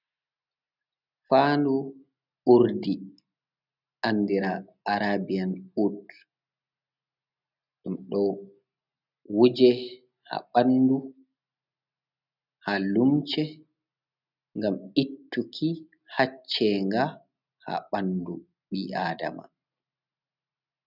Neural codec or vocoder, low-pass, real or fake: none; 5.4 kHz; real